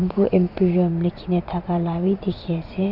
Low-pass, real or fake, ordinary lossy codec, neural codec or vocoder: 5.4 kHz; fake; none; vocoder, 44.1 kHz, 80 mel bands, Vocos